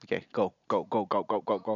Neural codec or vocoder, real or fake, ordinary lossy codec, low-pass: none; real; none; 7.2 kHz